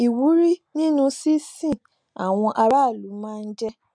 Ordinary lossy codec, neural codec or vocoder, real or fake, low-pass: none; none; real; 9.9 kHz